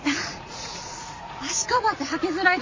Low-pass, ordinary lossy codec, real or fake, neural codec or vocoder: 7.2 kHz; MP3, 32 kbps; fake; vocoder, 44.1 kHz, 80 mel bands, Vocos